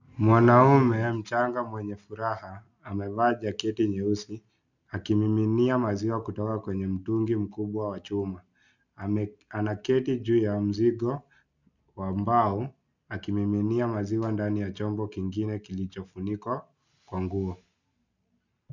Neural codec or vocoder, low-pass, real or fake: none; 7.2 kHz; real